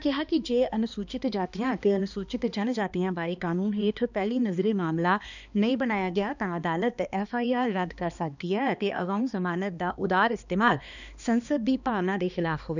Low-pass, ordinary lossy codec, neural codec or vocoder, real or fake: 7.2 kHz; none; codec, 16 kHz, 2 kbps, X-Codec, HuBERT features, trained on balanced general audio; fake